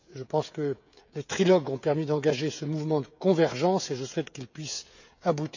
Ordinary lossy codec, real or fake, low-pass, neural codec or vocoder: none; fake; 7.2 kHz; codec, 16 kHz, 16 kbps, FreqCodec, smaller model